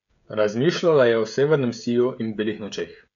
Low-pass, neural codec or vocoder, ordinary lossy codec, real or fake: 7.2 kHz; codec, 16 kHz, 16 kbps, FreqCodec, smaller model; none; fake